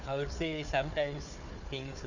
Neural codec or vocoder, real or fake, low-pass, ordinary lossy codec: codec, 16 kHz, 4 kbps, FunCodec, trained on Chinese and English, 50 frames a second; fake; 7.2 kHz; none